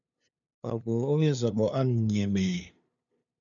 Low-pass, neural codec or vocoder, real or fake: 7.2 kHz; codec, 16 kHz, 2 kbps, FunCodec, trained on LibriTTS, 25 frames a second; fake